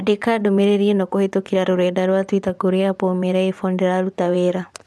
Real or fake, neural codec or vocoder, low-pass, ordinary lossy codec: fake; vocoder, 24 kHz, 100 mel bands, Vocos; none; none